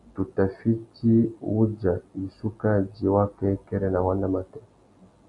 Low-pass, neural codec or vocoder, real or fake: 10.8 kHz; none; real